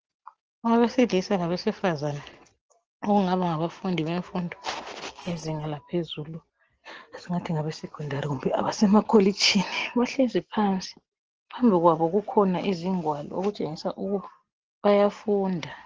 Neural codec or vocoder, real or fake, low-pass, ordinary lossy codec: none; real; 7.2 kHz; Opus, 16 kbps